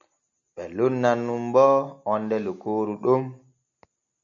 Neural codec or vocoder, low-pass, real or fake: none; 7.2 kHz; real